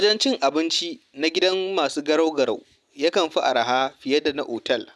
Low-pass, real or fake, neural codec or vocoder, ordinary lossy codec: none; real; none; none